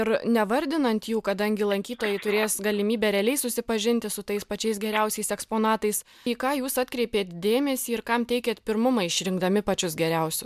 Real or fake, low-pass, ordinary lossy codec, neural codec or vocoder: real; 14.4 kHz; MP3, 96 kbps; none